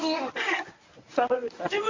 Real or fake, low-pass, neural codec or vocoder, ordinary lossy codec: fake; 7.2 kHz; codec, 24 kHz, 0.9 kbps, WavTokenizer, medium speech release version 1; MP3, 48 kbps